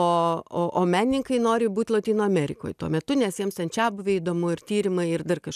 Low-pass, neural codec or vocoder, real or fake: 14.4 kHz; none; real